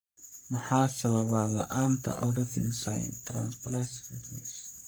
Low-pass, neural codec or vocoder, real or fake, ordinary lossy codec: none; codec, 44.1 kHz, 3.4 kbps, Pupu-Codec; fake; none